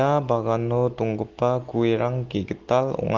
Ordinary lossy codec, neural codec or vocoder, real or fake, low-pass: Opus, 16 kbps; none; real; 7.2 kHz